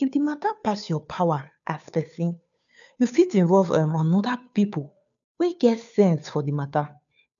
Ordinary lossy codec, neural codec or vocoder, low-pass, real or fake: none; codec, 16 kHz, 2 kbps, FunCodec, trained on Chinese and English, 25 frames a second; 7.2 kHz; fake